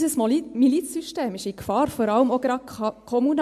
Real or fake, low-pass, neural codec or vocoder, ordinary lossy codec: real; 14.4 kHz; none; MP3, 64 kbps